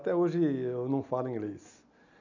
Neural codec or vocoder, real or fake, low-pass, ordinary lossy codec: none; real; 7.2 kHz; none